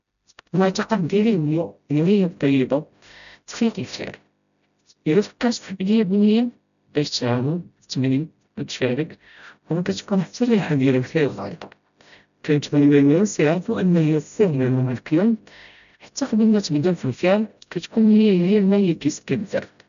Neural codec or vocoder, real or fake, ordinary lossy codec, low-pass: codec, 16 kHz, 0.5 kbps, FreqCodec, smaller model; fake; none; 7.2 kHz